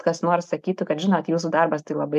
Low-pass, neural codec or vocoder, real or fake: 14.4 kHz; none; real